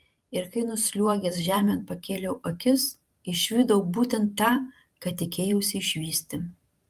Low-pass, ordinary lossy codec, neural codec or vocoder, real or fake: 14.4 kHz; Opus, 32 kbps; vocoder, 44.1 kHz, 128 mel bands every 512 samples, BigVGAN v2; fake